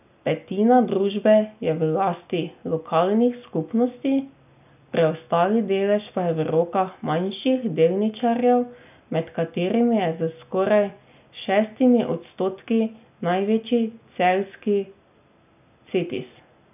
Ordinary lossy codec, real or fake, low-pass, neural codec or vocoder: none; real; 3.6 kHz; none